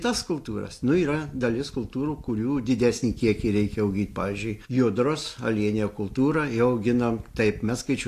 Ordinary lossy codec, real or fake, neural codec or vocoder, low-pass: AAC, 64 kbps; real; none; 14.4 kHz